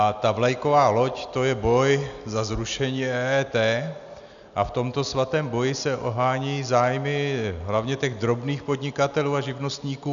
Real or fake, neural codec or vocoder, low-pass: real; none; 7.2 kHz